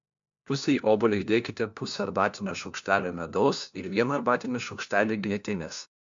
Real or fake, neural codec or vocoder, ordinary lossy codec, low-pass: fake; codec, 16 kHz, 1 kbps, FunCodec, trained on LibriTTS, 50 frames a second; MP3, 64 kbps; 7.2 kHz